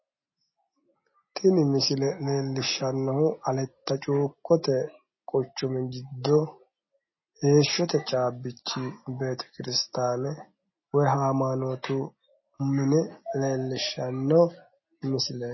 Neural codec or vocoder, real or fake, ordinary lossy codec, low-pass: none; real; MP3, 24 kbps; 7.2 kHz